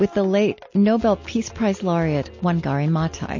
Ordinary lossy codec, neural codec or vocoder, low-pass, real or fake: MP3, 32 kbps; none; 7.2 kHz; real